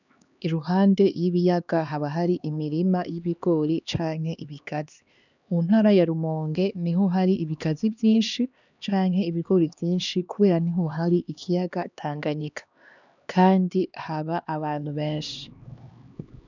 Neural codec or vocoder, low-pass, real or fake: codec, 16 kHz, 2 kbps, X-Codec, HuBERT features, trained on LibriSpeech; 7.2 kHz; fake